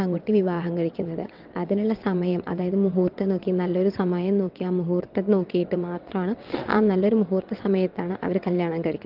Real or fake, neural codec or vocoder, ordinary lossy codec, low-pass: fake; vocoder, 22.05 kHz, 80 mel bands, WaveNeXt; Opus, 32 kbps; 5.4 kHz